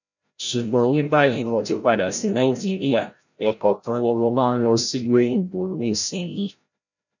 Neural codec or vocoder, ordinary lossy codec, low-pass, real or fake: codec, 16 kHz, 0.5 kbps, FreqCodec, larger model; none; 7.2 kHz; fake